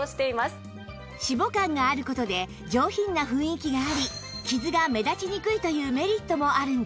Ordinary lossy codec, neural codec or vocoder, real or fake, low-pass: none; none; real; none